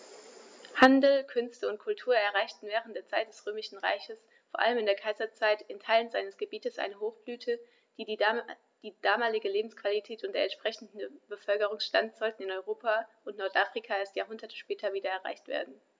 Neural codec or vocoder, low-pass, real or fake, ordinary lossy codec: none; none; real; none